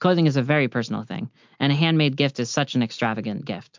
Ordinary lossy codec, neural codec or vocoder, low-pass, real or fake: MP3, 64 kbps; none; 7.2 kHz; real